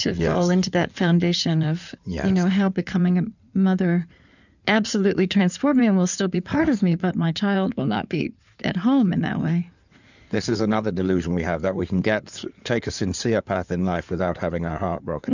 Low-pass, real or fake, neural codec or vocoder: 7.2 kHz; fake; codec, 16 kHz in and 24 kHz out, 2.2 kbps, FireRedTTS-2 codec